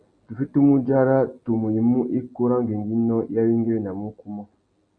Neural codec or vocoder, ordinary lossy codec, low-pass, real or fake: none; AAC, 64 kbps; 9.9 kHz; real